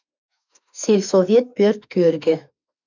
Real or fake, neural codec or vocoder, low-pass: fake; autoencoder, 48 kHz, 32 numbers a frame, DAC-VAE, trained on Japanese speech; 7.2 kHz